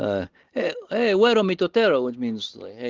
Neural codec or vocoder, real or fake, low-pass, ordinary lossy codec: none; real; 7.2 kHz; Opus, 16 kbps